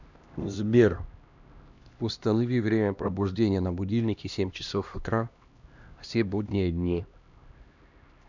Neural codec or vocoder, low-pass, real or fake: codec, 16 kHz, 1 kbps, X-Codec, HuBERT features, trained on LibriSpeech; 7.2 kHz; fake